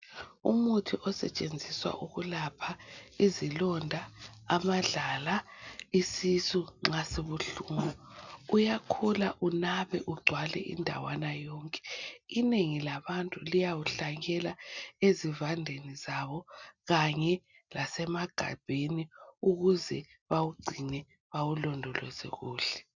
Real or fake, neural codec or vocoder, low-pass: real; none; 7.2 kHz